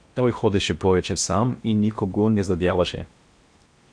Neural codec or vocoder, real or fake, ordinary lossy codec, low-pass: codec, 16 kHz in and 24 kHz out, 0.8 kbps, FocalCodec, streaming, 65536 codes; fake; AAC, 64 kbps; 9.9 kHz